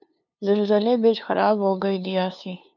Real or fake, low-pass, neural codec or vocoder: fake; 7.2 kHz; codec, 16 kHz, 2 kbps, FunCodec, trained on LibriTTS, 25 frames a second